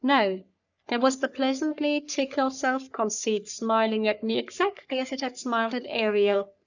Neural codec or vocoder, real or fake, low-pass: codec, 44.1 kHz, 3.4 kbps, Pupu-Codec; fake; 7.2 kHz